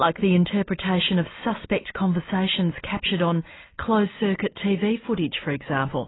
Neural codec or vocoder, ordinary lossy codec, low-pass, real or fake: none; AAC, 16 kbps; 7.2 kHz; real